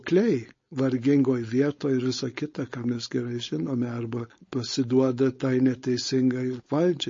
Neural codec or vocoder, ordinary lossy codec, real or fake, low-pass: codec, 16 kHz, 4.8 kbps, FACodec; MP3, 32 kbps; fake; 7.2 kHz